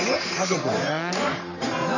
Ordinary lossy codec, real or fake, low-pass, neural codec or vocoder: none; fake; 7.2 kHz; codec, 44.1 kHz, 3.4 kbps, Pupu-Codec